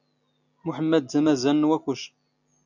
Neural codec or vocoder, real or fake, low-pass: none; real; 7.2 kHz